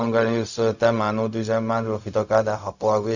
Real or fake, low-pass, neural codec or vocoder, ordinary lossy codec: fake; 7.2 kHz; codec, 16 kHz, 0.4 kbps, LongCat-Audio-Codec; none